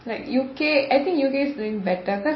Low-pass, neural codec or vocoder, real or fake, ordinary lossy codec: 7.2 kHz; none; real; MP3, 24 kbps